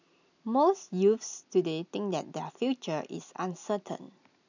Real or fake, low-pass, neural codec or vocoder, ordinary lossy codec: real; 7.2 kHz; none; none